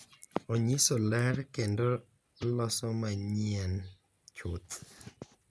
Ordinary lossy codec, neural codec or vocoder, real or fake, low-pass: none; none; real; none